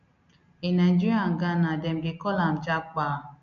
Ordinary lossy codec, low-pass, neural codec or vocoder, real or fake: none; 7.2 kHz; none; real